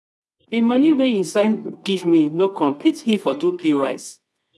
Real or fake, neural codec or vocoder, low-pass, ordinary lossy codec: fake; codec, 24 kHz, 0.9 kbps, WavTokenizer, medium music audio release; none; none